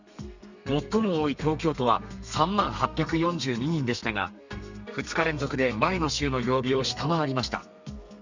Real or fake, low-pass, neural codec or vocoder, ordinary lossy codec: fake; 7.2 kHz; codec, 32 kHz, 1.9 kbps, SNAC; Opus, 64 kbps